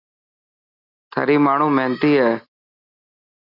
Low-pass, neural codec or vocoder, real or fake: 5.4 kHz; none; real